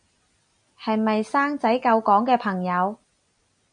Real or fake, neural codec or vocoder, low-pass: real; none; 9.9 kHz